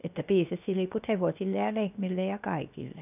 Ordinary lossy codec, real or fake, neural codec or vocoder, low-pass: none; fake; codec, 16 kHz, 0.7 kbps, FocalCodec; 3.6 kHz